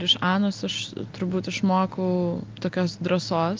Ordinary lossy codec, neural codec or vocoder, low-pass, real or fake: Opus, 24 kbps; none; 7.2 kHz; real